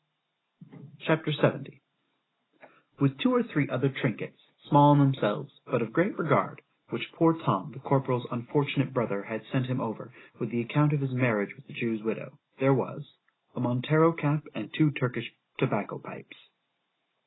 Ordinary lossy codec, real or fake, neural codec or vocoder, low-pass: AAC, 16 kbps; real; none; 7.2 kHz